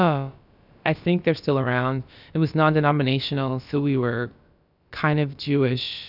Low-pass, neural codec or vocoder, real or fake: 5.4 kHz; codec, 16 kHz, about 1 kbps, DyCAST, with the encoder's durations; fake